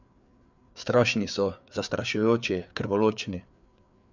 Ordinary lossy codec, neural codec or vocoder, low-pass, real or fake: none; codec, 16 kHz, 4 kbps, FreqCodec, larger model; 7.2 kHz; fake